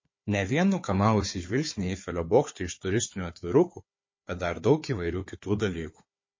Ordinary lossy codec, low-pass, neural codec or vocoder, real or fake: MP3, 32 kbps; 7.2 kHz; codec, 16 kHz, 4 kbps, FunCodec, trained on Chinese and English, 50 frames a second; fake